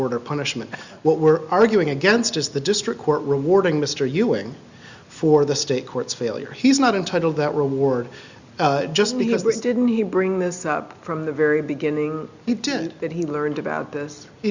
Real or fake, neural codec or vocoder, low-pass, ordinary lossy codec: real; none; 7.2 kHz; Opus, 64 kbps